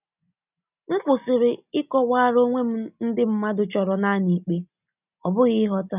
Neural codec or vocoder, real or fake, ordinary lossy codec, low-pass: none; real; none; 3.6 kHz